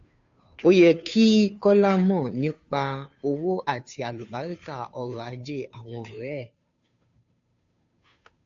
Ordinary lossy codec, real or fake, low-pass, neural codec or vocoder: AAC, 64 kbps; fake; 7.2 kHz; codec, 16 kHz, 2 kbps, FunCodec, trained on Chinese and English, 25 frames a second